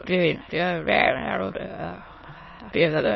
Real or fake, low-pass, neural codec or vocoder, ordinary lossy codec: fake; 7.2 kHz; autoencoder, 22.05 kHz, a latent of 192 numbers a frame, VITS, trained on many speakers; MP3, 24 kbps